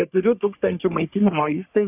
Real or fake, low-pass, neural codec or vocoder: fake; 3.6 kHz; codec, 44.1 kHz, 3.4 kbps, Pupu-Codec